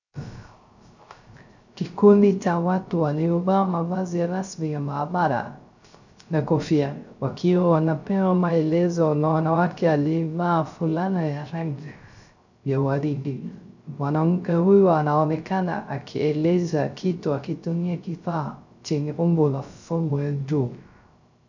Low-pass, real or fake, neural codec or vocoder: 7.2 kHz; fake; codec, 16 kHz, 0.3 kbps, FocalCodec